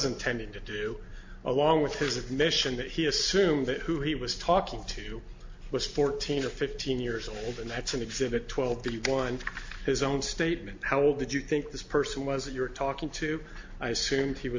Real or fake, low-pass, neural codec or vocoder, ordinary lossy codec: real; 7.2 kHz; none; MP3, 48 kbps